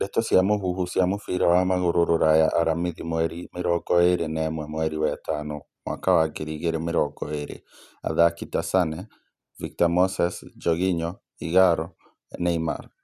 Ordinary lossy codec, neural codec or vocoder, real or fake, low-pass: none; none; real; 14.4 kHz